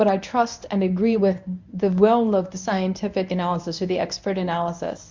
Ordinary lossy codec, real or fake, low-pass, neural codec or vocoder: MP3, 64 kbps; fake; 7.2 kHz; codec, 24 kHz, 0.9 kbps, WavTokenizer, medium speech release version 1